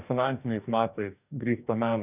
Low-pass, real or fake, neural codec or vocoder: 3.6 kHz; fake; codec, 44.1 kHz, 2.6 kbps, DAC